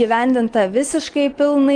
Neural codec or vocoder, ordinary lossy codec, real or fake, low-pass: none; Opus, 24 kbps; real; 9.9 kHz